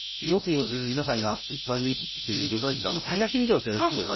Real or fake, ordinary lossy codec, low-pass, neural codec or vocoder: fake; MP3, 24 kbps; 7.2 kHz; codec, 16 kHz, 0.5 kbps, FreqCodec, larger model